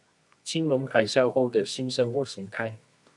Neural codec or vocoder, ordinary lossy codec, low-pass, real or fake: codec, 24 kHz, 0.9 kbps, WavTokenizer, medium music audio release; MP3, 96 kbps; 10.8 kHz; fake